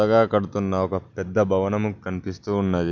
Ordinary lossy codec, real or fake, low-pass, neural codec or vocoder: none; real; 7.2 kHz; none